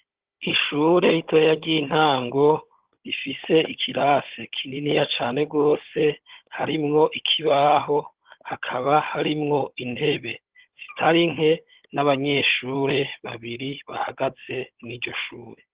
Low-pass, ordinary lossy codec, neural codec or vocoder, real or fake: 3.6 kHz; Opus, 16 kbps; codec, 16 kHz, 16 kbps, FunCodec, trained on Chinese and English, 50 frames a second; fake